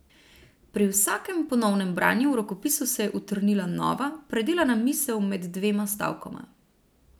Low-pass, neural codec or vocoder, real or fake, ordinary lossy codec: none; none; real; none